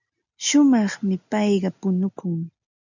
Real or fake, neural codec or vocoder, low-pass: real; none; 7.2 kHz